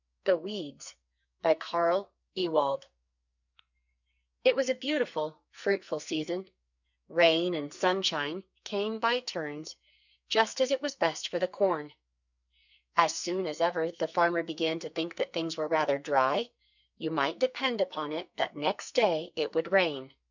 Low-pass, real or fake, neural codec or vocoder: 7.2 kHz; fake; codec, 44.1 kHz, 2.6 kbps, SNAC